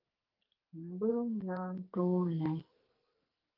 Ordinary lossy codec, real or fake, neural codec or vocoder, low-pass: Opus, 32 kbps; fake; codec, 44.1 kHz, 2.6 kbps, SNAC; 5.4 kHz